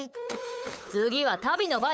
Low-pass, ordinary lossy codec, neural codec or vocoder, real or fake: none; none; codec, 16 kHz, 8 kbps, FunCodec, trained on LibriTTS, 25 frames a second; fake